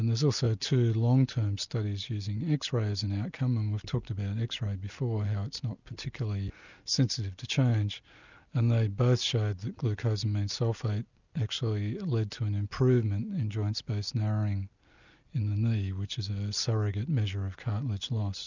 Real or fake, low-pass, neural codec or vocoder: real; 7.2 kHz; none